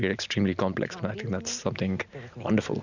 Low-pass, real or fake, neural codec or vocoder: 7.2 kHz; real; none